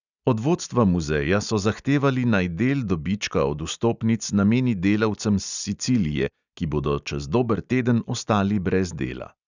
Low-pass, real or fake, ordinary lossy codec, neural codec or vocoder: 7.2 kHz; real; none; none